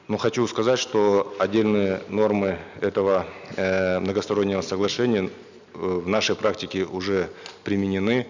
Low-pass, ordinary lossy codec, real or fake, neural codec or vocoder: 7.2 kHz; none; real; none